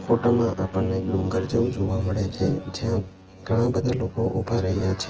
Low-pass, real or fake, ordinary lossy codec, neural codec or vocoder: 7.2 kHz; fake; Opus, 16 kbps; vocoder, 24 kHz, 100 mel bands, Vocos